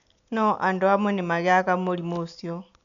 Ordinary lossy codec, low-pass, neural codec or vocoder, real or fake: none; 7.2 kHz; none; real